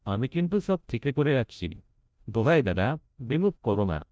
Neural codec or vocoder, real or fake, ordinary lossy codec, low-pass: codec, 16 kHz, 0.5 kbps, FreqCodec, larger model; fake; none; none